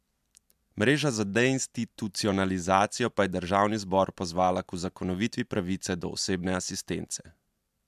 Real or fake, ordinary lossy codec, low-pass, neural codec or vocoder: real; MP3, 96 kbps; 14.4 kHz; none